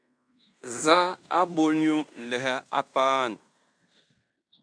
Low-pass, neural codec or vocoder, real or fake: 9.9 kHz; codec, 16 kHz in and 24 kHz out, 0.9 kbps, LongCat-Audio-Codec, fine tuned four codebook decoder; fake